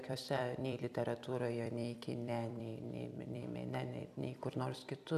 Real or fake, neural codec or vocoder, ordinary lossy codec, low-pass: fake; vocoder, 44.1 kHz, 128 mel bands every 256 samples, BigVGAN v2; AAC, 96 kbps; 14.4 kHz